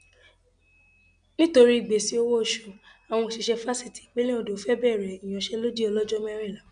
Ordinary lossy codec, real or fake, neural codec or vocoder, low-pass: none; real; none; 9.9 kHz